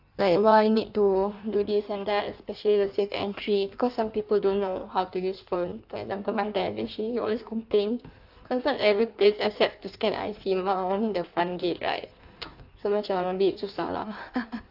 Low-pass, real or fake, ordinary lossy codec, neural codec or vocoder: 5.4 kHz; fake; AAC, 48 kbps; codec, 16 kHz in and 24 kHz out, 1.1 kbps, FireRedTTS-2 codec